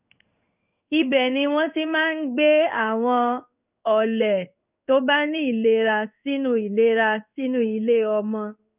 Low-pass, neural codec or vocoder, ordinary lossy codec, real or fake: 3.6 kHz; codec, 16 kHz in and 24 kHz out, 1 kbps, XY-Tokenizer; none; fake